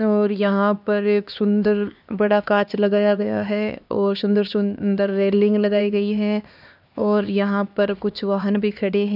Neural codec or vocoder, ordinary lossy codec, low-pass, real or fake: codec, 16 kHz, 2 kbps, X-Codec, HuBERT features, trained on LibriSpeech; none; 5.4 kHz; fake